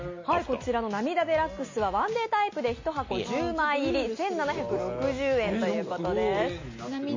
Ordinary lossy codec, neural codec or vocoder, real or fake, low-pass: MP3, 32 kbps; none; real; 7.2 kHz